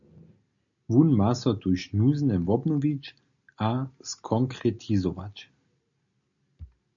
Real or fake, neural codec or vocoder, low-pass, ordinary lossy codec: real; none; 7.2 kHz; MP3, 96 kbps